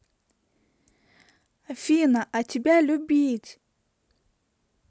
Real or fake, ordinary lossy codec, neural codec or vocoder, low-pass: real; none; none; none